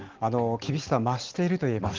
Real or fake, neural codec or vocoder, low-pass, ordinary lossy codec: real; none; 7.2 kHz; Opus, 16 kbps